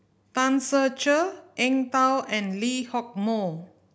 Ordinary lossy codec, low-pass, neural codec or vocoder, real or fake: none; none; none; real